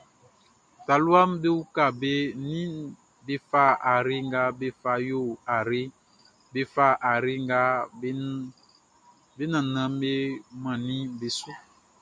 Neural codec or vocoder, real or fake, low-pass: none; real; 9.9 kHz